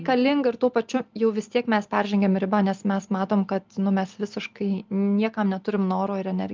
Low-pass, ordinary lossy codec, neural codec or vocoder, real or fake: 7.2 kHz; Opus, 32 kbps; none; real